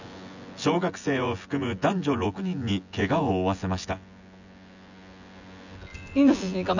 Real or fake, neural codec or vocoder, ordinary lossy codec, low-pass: fake; vocoder, 24 kHz, 100 mel bands, Vocos; none; 7.2 kHz